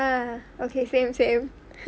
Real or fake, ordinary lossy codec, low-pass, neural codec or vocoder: real; none; none; none